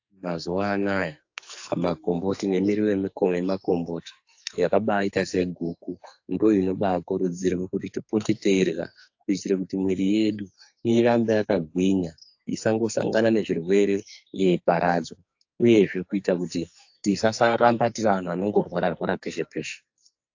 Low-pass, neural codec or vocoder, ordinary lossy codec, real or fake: 7.2 kHz; codec, 44.1 kHz, 2.6 kbps, SNAC; AAC, 48 kbps; fake